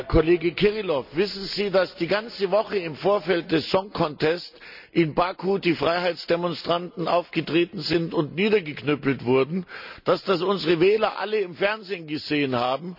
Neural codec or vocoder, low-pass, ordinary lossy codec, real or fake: none; 5.4 kHz; none; real